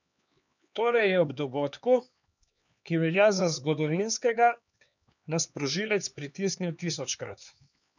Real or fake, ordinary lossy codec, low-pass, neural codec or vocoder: fake; none; 7.2 kHz; codec, 16 kHz, 4 kbps, X-Codec, HuBERT features, trained on LibriSpeech